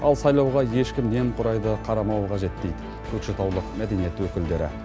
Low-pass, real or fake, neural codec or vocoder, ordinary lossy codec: none; real; none; none